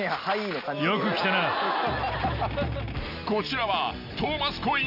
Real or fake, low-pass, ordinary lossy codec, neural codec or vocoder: real; 5.4 kHz; none; none